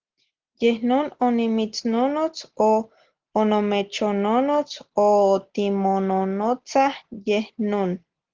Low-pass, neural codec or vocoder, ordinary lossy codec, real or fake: 7.2 kHz; none; Opus, 16 kbps; real